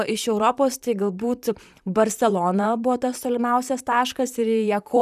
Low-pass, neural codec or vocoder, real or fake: 14.4 kHz; vocoder, 44.1 kHz, 128 mel bands, Pupu-Vocoder; fake